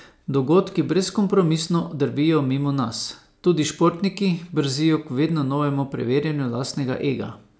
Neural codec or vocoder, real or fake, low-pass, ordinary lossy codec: none; real; none; none